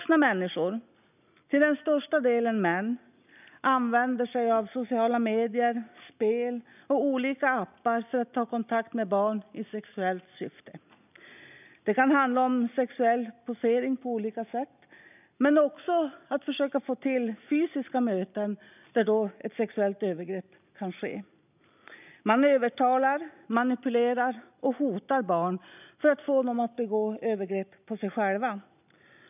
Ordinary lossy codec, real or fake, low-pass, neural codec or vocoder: none; real; 3.6 kHz; none